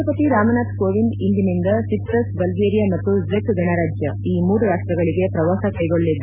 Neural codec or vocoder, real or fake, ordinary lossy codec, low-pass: none; real; none; 3.6 kHz